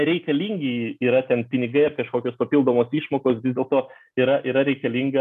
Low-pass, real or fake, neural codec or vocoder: 14.4 kHz; real; none